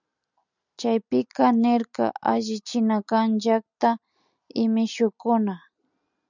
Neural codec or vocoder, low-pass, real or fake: none; 7.2 kHz; real